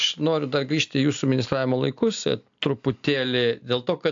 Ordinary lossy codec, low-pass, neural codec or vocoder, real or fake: AAC, 64 kbps; 7.2 kHz; none; real